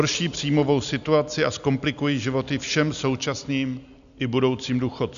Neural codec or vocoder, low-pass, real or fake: none; 7.2 kHz; real